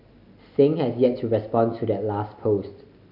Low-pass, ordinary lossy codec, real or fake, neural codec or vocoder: 5.4 kHz; none; real; none